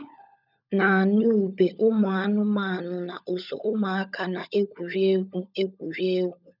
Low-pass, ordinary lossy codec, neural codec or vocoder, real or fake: 5.4 kHz; none; codec, 16 kHz, 16 kbps, FunCodec, trained on LibriTTS, 50 frames a second; fake